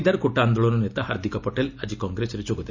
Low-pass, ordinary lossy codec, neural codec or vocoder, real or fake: 7.2 kHz; none; none; real